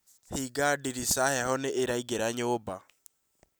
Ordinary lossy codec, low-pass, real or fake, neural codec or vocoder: none; none; real; none